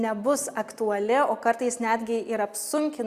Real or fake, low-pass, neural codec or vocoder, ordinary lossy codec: real; 14.4 kHz; none; Opus, 64 kbps